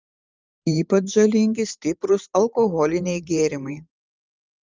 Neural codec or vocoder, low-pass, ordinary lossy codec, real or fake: vocoder, 44.1 kHz, 128 mel bands every 512 samples, BigVGAN v2; 7.2 kHz; Opus, 24 kbps; fake